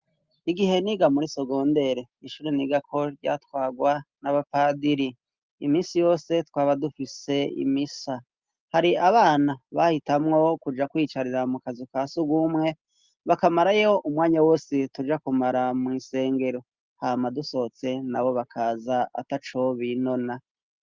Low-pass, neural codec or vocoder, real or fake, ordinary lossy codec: 7.2 kHz; none; real; Opus, 32 kbps